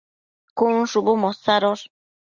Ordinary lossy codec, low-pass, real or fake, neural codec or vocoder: Opus, 64 kbps; 7.2 kHz; real; none